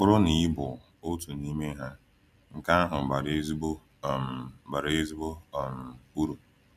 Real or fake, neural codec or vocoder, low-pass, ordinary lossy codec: real; none; 14.4 kHz; none